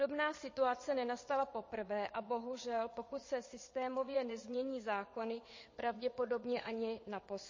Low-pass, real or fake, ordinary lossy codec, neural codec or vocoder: 7.2 kHz; fake; MP3, 32 kbps; vocoder, 22.05 kHz, 80 mel bands, WaveNeXt